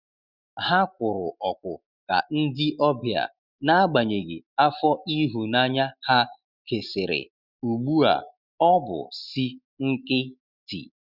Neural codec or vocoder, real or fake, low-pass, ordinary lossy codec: none; real; 5.4 kHz; Opus, 64 kbps